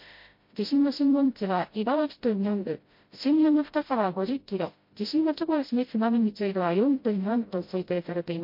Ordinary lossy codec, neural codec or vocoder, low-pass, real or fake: MP3, 32 kbps; codec, 16 kHz, 0.5 kbps, FreqCodec, smaller model; 5.4 kHz; fake